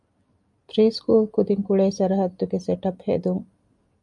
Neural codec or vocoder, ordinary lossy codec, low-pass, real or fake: none; MP3, 96 kbps; 10.8 kHz; real